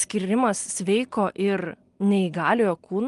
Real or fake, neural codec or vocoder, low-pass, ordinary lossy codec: real; none; 10.8 kHz; Opus, 32 kbps